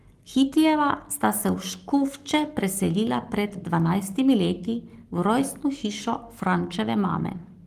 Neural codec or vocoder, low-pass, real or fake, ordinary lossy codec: codec, 44.1 kHz, 7.8 kbps, Pupu-Codec; 14.4 kHz; fake; Opus, 24 kbps